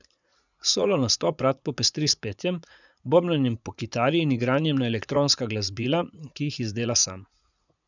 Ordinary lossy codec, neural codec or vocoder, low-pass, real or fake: none; vocoder, 44.1 kHz, 80 mel bands, Vocos; 7.2 kHz; fake